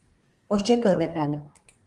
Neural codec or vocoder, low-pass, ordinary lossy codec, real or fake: codec, 24 kHz, 1 kbps, SNAC; 10.8 kHz; Opus, 24 kbps; fake